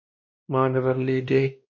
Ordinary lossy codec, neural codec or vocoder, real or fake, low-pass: MP3, 32 kbps; codec, 16 kHz, 1 kbps, X-Codec, WavLM features, trained on Multilingual LibriSpeech; fake; 7.2 kHz